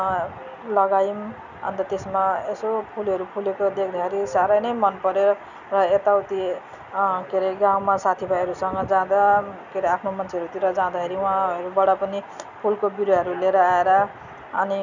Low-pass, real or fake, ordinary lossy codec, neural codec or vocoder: 7.2 kHz; real; none; none